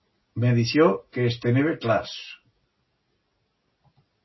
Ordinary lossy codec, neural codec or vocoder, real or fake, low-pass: MP3, 24 kbps; none; real; 7.2 kHz